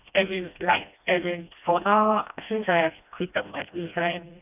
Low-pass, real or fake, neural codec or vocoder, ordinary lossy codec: 3.6 kHz; fake; codec, 16 kHz, 1 kbps, FreqCodec, smaller model; none